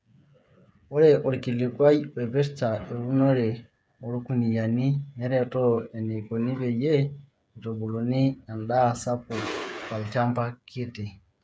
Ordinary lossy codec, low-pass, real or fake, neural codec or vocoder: none; none; fake; codec, 16 kHz, 8 kbps, FreqCodec, smaller model